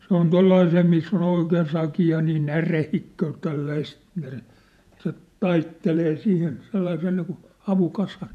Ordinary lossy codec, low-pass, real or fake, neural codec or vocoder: none; 14.4 kHz; fake; vocoder, 48 kHz, 128 mel bands, Vocos